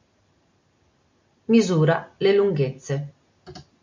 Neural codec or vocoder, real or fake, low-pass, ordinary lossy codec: none; real; 7.2 kHz; MP3, 48 kbps